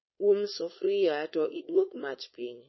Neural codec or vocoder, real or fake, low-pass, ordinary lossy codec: codec, 16 kHz, 4.8 kbps, FACodec; fake; 7.2 kHz; MP3, 24 kbps